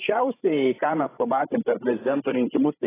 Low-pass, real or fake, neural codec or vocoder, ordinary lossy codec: 3.6 kHz; fake; codec, 16 kHz, 16 kbps, FreqCodec, larger model; AAC, 16 kbps